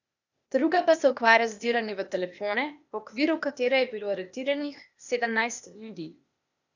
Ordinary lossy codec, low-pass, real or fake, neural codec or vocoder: none; 7.2 kHz; fake; codec, 16 kHz, 0.8 kbps, ZipCodec